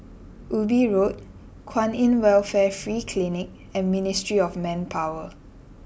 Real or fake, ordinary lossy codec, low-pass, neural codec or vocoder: real; none; none; none